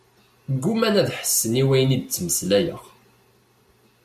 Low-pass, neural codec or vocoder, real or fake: 14.4 kHz; none; real